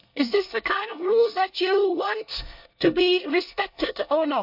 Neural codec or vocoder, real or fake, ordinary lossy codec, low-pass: codec, 24 kHz, 1 kbps, SNAC; fake; AAC, 48 kbps; 5.4 kHz